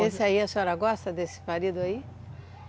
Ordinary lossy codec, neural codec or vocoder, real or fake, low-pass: none; none; real; none